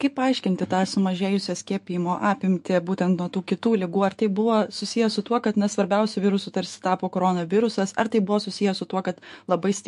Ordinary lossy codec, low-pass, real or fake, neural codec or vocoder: MP3, 48 kbps; 14.4 kHz; fake; autoencoder, 48 kHz, 128 numbers a frame, DAC-VAE, trained on Japanese speech